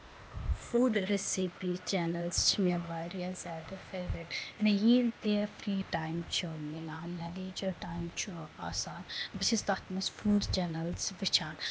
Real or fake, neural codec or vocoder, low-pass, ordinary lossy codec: fake; codec, 16 kHz, 0.8 kbps, ZipCodec; none; none